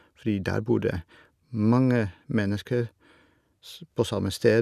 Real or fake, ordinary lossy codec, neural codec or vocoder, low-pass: real; none; none; 14.4 kHz